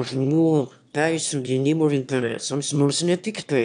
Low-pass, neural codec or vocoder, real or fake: 9.9 kHz; autoencoder, 22.05 kHz, a latent of 192 numbers a frame, VITS, trained on one speaker; fake